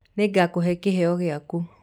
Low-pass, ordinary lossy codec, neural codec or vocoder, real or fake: 19.8 kHz; none; none; real